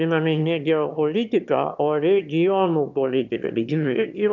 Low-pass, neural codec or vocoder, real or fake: 7.2 kHz; autoencoder, 22.05 kHz, a latent of 192 numbers a frame, VITS, trained on one speaker; fake